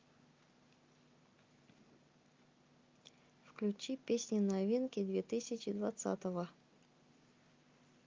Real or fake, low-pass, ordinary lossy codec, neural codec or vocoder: real; 7.2 kHz; Opus, 24 kbps; none